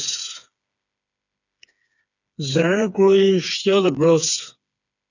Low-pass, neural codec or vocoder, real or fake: 7.2 kHz; codec, 16 kHz, 4 kbps, FreqCodec, smaller model; fake